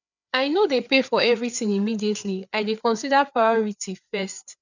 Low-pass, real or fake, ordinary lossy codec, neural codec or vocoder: 7.2 kHz; fake; none; codec, 16 kHz, 4 kbps, FreqCodec, larger model